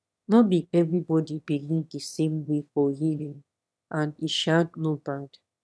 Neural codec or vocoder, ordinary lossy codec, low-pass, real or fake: autoencoder, 22.05 kHz, a latent of 192 numbers a frame, VITS, trained on one speaker; none; none; fake